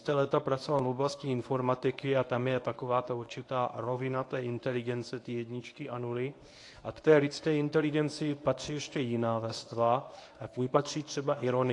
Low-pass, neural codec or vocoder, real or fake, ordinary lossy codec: 10.8 kHz; codec, 24 kHz, 0.9 kbps, WavTokenizer, medium speech release version 1; fake; AAC, 48 kbps